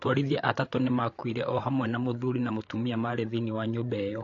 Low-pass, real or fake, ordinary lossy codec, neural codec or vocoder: 7.2 kHz; fake; AAC, 48 kbps; codec, 16 kHz, 8 kbps, FreqCodec, larger model